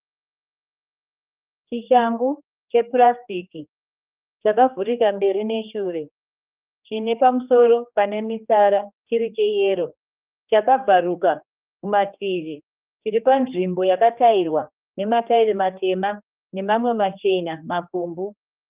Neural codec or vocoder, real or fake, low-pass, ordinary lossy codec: codec, 16 kHz, 2 kbps, X-Codec, HuBERT features, trained on general audio; fake; 3.6 kHz; Opus, 24 kbps